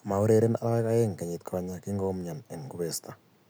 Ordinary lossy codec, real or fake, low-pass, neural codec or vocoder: none; real; none; none